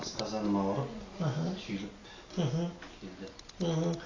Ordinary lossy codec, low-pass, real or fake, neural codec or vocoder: AAC, 32 kbps; 7.2 kHz; real; none